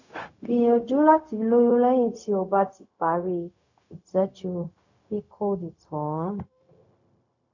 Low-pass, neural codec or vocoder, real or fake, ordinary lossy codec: 7.2 kHz; codec, 16 kHz, 0.4 kbps, LongCat-Audio-Codec; fake; none